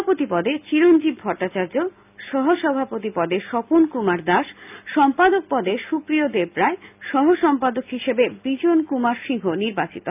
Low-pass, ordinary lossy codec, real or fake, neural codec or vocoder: 3.6 kHz; none; real; none